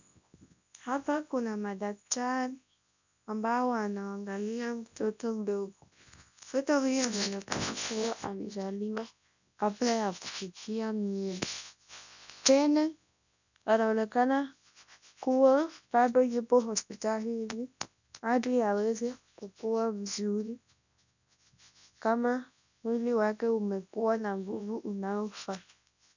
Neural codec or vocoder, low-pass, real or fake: codec, 24 kHz, 0.9 kbps, WavTokenizer, large speech release; 7.2 kHz; fake